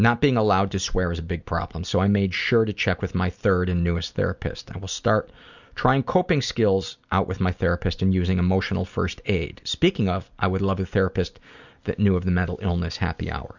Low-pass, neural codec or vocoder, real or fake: 7.2 kHz; none; real